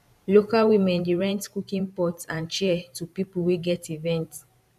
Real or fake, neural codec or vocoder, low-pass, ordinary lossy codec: fake; vocoder, 44.1 kHz, 128 mel bands every 512 samples, BigVGAN v2; 14.4 kHz; none